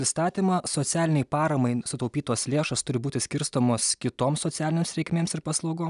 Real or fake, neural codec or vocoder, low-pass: real; none; 10.8 kHz